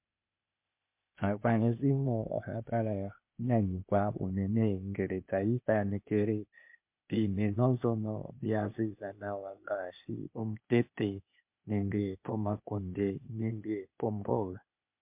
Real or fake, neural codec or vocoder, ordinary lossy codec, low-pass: fake; codec, 16 kHz, 0.8 kbps, ZipCodec; MP3, 32 kbps; 3.6 kHz